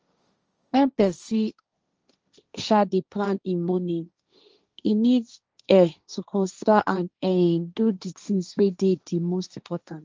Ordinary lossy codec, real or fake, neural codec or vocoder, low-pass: Opus, 24 kbps; fake; codec, 16 kHz, 1.1 kbps, Voila-Tokenizer; 7.2 kHz